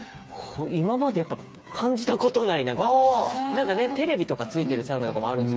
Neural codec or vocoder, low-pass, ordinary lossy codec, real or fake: codec, 16 kHz, 4 kbps, FreqCodec, smaller model; none; none; fake